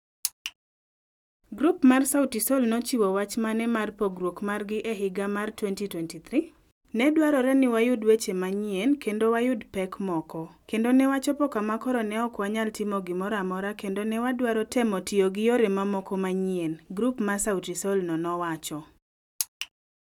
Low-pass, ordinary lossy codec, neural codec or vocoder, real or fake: 19.8 kHz; none; none; real